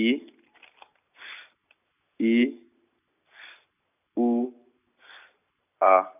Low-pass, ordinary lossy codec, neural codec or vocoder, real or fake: 3.6 kHz; none; none; real